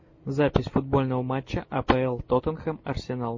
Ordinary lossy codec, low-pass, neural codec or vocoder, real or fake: MP3, 32 kbps; 7.2 kHz; none; real